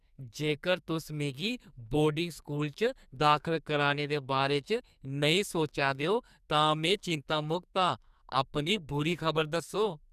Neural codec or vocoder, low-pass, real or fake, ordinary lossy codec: codec, 44.1 kHz, 2.6 kbps, SNAC; 14.4 kHz; fake; none